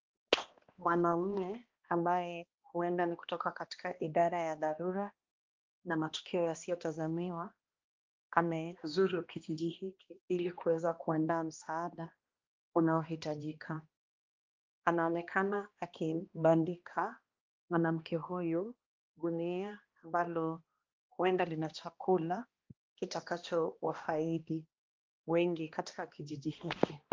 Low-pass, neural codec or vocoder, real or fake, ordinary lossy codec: 7.2 kHz; codec, 16 kHz, 1 kbps, X-Codec, HuBERT features, trained on balanced general audio; fake; Opus, 24 kbps